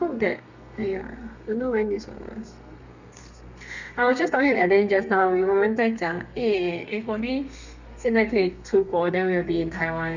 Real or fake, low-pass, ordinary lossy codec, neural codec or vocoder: fake; 7.2 kHz; none; codec, 32 kHz, 1.9 kbps, SNAC